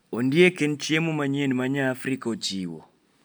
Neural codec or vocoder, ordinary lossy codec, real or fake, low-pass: none; none; real; none